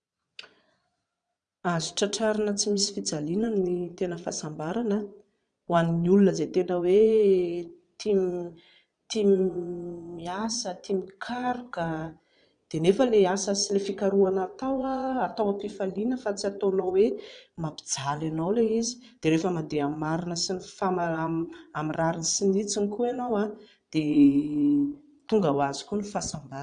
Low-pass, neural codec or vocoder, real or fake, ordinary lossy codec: 9.9 kHz; vocoder, 22.05 kHz, 80 mel bands, Vocos; fake; none